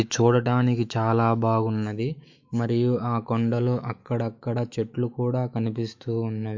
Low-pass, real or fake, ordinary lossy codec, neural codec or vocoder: 7.2 kHz; fake; MP3, 48 kbps; autoencoder, 48 kHz, 128 numbers a frame, DAC-VAE, trained on Japanese speech